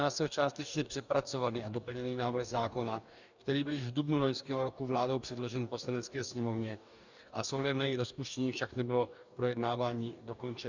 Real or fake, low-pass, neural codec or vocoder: fake; 7.2 kHz; codec, 44.1 kHz, 2.6 kbps, DAC